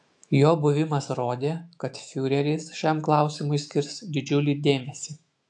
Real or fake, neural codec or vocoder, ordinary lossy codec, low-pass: fake; autoencoder, 48 kHz, 128 numbers a frame, DAC-VAE, trained on Japanese speech; AAC, 64 kbps; 10.8 kHz